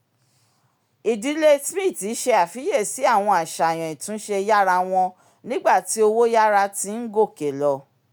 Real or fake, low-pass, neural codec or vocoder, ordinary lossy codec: real; none; none; none